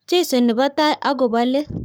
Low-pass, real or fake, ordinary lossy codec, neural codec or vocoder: none; fake; none; codec, 44.1 kHz, 7.8 kbps, Pupu-Codec